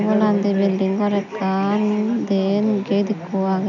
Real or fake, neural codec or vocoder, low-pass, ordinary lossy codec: real; none; 7.2 kHz; none